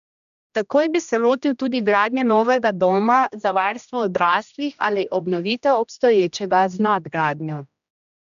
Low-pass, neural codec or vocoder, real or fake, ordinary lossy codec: 7.2 kHz; codec, 16 kHz, 1 kbps, X-Codec, HuBERT features, trained on general audio; fake; none